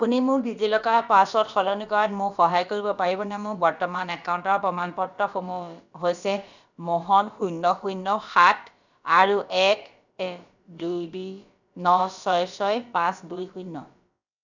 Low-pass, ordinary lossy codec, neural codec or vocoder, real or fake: 7.2 kHz; none; codec, 16 kHz, about 1 kbps, DyCAST, with the encoder's durations; fake